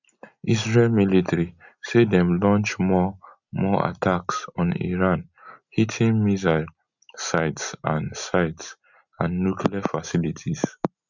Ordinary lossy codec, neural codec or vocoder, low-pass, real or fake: none; none; 7.2 kHz; real